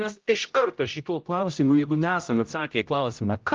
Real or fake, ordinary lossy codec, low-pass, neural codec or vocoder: fake; Opus, 24 kbps; 7.2 kHz; codec, 16 kHz, 0.5 kbps, X-Codec, HuBERT features, trained on general audio